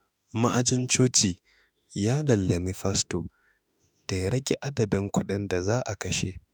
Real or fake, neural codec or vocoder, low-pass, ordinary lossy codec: fake; autoencoder, 48 kHz, 32 numbers a frame, DAC-VAE, trained on Japanese speech; none; none